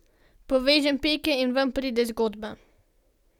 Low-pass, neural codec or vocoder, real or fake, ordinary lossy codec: 19.8 kHz; none; real; none